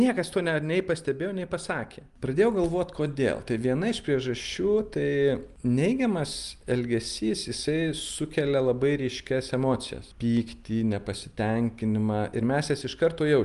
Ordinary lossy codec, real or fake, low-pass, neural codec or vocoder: Opus, 32 kbps; real; 10.8 kHz; none